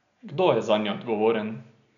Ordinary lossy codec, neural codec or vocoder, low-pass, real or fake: MP3, 96 kbps; none; 7.2 kHz; real